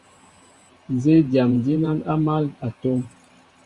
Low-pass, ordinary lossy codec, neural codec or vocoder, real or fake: 10.8 kHz; Opus, 64 kbps; vocoder, 44.1 kHz, 128 mel bands every 512 samples, BigVGAN v2; fake